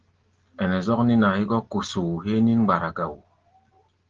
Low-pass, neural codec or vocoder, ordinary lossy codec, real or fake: 7.2 kHz; none; Opus, 16 kbps; real